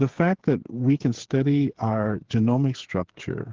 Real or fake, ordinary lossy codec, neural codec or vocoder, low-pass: fake; Opus, 16 kbps; codec, 16 kHz, 8 kbps, FreqCodec, smaller model; 7.2 kHz